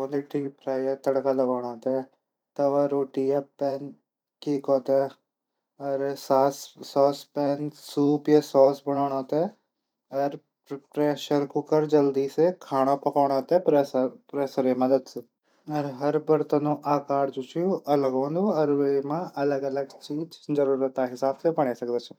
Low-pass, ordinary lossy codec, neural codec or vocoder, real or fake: 19.8 kHz; none; vocoder, 44.1 kHz, 128 mel bands every 512 samples, BigVGAN v2; fake